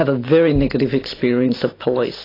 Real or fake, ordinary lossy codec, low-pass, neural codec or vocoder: real; AAC, 24 kbps; 5.4 kHz; none